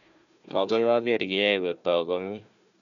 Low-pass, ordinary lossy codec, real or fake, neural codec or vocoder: 7.2 kHz; none; fake; codec, 16 kHz, 1 kbps, FunCodec, trained on Chinese and English, 50 frames a second